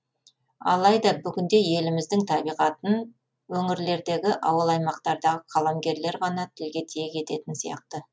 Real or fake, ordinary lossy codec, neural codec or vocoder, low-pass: real; none; none; none